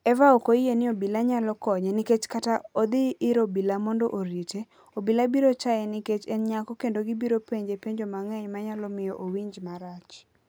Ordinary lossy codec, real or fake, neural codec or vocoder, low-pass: none; real; none; none